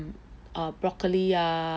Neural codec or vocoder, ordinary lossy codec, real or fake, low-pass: none; none; real; none